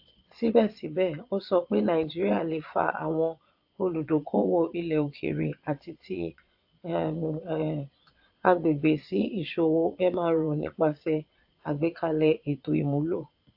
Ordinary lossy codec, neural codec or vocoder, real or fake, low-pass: AAC, 48 kbps; vocoder, 22.05 kHz, 80 mel bands, Vocos; fake; 5.4 kHz